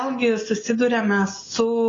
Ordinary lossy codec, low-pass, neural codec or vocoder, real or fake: AAC, 32 kbps; 7.2 kHz; none; real